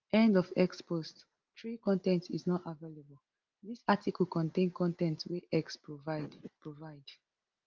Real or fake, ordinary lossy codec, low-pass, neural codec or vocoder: real; Opus, 32 kbps; 7.2 kHz; none